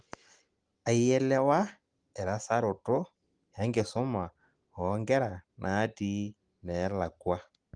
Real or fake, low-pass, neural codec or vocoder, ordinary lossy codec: real; 9.9 kHz; none; Opus, 24 kbps